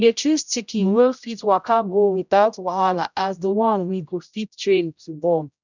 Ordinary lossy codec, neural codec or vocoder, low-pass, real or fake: none; codec, 16 kHz, 0.5 kbps, X-Codec, HuBERT features, trained on general audio; 7.2 kHz; fake